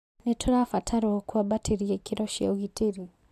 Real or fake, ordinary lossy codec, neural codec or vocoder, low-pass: real; MP3, 96 kbps; none; 14.4 kHz